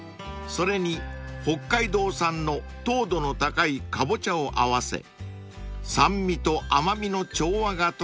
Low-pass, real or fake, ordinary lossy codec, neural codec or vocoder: none; real; none; none